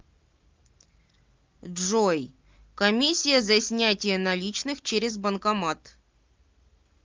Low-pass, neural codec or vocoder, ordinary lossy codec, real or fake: 7.2 kHz; none; Opus, 32 kbps; real